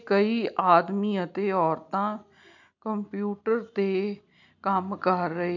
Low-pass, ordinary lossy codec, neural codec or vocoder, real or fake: 7.2 kHz; none; none; real